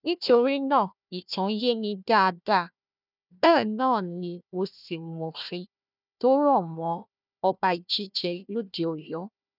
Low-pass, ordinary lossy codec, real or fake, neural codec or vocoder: 5.4 kHz; none; fake; codec, 16 kHz, 1 kbps, FunCodec, trained on Chinese and English, 50 frames a second